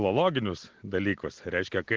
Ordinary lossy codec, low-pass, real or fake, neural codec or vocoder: Opus, 16 kbps; 7.2 kHz; real; none